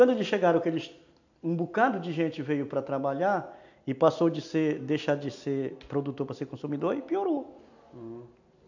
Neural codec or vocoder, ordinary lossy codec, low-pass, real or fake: none; none; 7.2 kHz; real